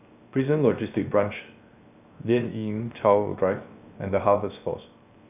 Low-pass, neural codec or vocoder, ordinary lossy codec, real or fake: 3.6 kHz; codec, 16 kHz, 0.7 kbps, FocalCodec; none; fake